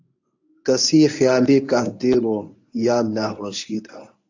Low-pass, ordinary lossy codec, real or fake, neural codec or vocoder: 7.2 kHz; AAC, 48 kbps; fake; codec, 24 kHz, 0.9 kbps, WavTokenizer, medium speech release version 1